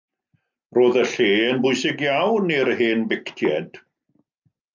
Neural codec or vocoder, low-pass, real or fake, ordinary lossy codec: none; 7.2 kHz; real; MP3, 64 kbps